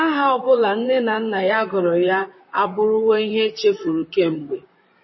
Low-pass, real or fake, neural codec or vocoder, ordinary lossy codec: 7.2 kHz; fake; vocoder, 44.1 kHz, 128 mel bands, Pupu-Vocoder; MP3, 24 kbps